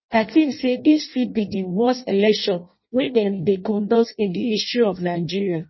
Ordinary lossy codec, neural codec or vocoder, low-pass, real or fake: MP3, 24 kbps; codec, 16 kHz in and 24 kHz out, 0.6 kbps, FireRedTTS-2 codec; 7.2 kHz; fake